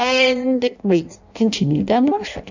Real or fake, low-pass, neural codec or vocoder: fake; 7.2 kHz; codec, 16 kHz in and 24 kHz out, 0.6 kbps, FireRedTTS-2 codec